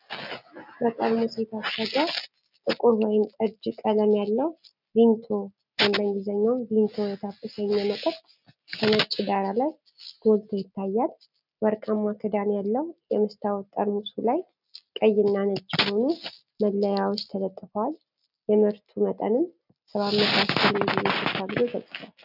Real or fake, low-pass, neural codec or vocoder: real; 5.4 kHz; none